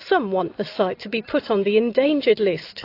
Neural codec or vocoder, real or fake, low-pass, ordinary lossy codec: none; real; 5.4 kHz; AAC, 32 kbps